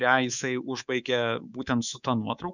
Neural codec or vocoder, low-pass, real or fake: codec, 24 kHz, 3.1 kbps, DualCodec; 7.2 kHz; fake